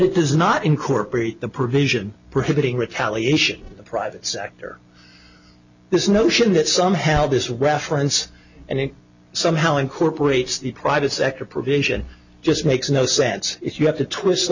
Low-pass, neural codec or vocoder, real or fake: 7.2 kHz; none; real